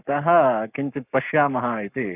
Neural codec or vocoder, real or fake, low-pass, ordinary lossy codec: codec, 44.1 kHz, 7.8 kbps, Pupu-Codec; fake; 3.6 kHz; none